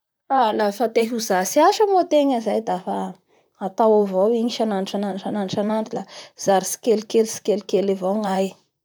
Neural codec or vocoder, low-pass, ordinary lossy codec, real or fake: vocoder, 44.1 kHz, 128 mel bands, Pupu-Vocoder; none; none; fake